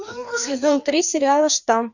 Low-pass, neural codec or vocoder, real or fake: 7.2 kHz; codec, 16 kHz, 2 kbps, FreqCodec, larger model; fake